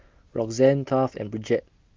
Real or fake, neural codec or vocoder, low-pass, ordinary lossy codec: real; none; 7.2 kHz; Opus, 32 kbps